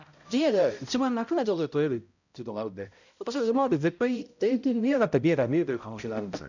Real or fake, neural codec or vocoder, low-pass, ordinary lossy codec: fake; codec, 16 kHz, 0.5 kbps, X-Codec, HuBERT features, trained on balanced general audio; 7.2 kHz; none